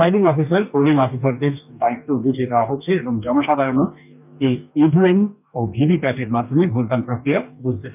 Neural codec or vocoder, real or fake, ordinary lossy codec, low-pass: codec, 44.1 kHz, 2.6 kbps, DAC; fake; none; 3.6 kHz